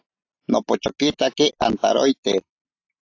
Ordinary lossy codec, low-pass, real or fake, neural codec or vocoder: AAC, 48 kbps; 7.2 kHz; real; none